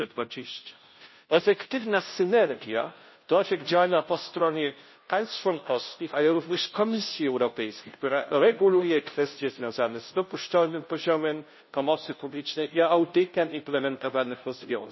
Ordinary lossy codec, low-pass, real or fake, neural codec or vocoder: MP3, 24 kbps; 7.2 kHz; fake; codec, 16 kHz, 0.5 kbps, FunCodec, trained on Chinese and English, 25 frames a second